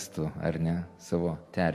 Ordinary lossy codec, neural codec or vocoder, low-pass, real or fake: MP3, 64 kbps; none; 14.4 kHz; real